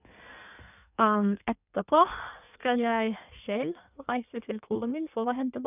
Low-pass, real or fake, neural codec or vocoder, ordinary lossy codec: 3.6 kHz; fake; codec, 16 kHz in and 24 kHz out, 1.1 kbps, FireRedTTS-2 codec; none